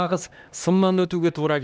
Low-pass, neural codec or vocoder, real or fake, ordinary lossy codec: none; codec, 16 kHz, 1 kbps, X-Codec, HuBERT features, trained on LibriSpeech; fake; none